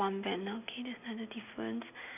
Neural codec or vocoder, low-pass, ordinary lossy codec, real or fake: none; 3.6 kHz; none; real